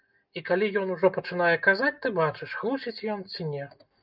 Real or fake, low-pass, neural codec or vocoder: real; 5.4 kHz; none